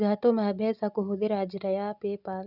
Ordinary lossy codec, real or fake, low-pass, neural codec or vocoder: none; real; 5.4 kHz; none